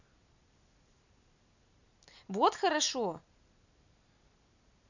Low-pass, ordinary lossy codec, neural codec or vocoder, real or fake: 7.2 kHz; none; none; real